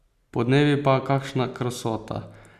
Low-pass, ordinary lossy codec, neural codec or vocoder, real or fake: 14.4 kHz; none; none; real